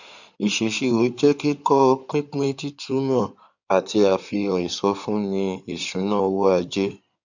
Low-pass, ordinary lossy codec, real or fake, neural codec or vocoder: 7.2 kHz; none; fake; codec, 16 kHz in and 24 kHz out, 2.2 kbps, FireRedTTS-2 codec